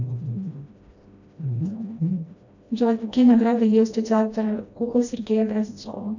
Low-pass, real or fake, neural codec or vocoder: 7.2 kHz; fake; codec, 16 kHz, 1 kbps, FreqCodec, smaller model